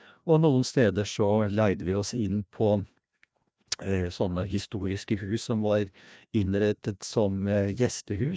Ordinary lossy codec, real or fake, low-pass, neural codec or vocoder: none; fake; none; codec, 16 kHz, 1 kbps, FreqCodec, larger model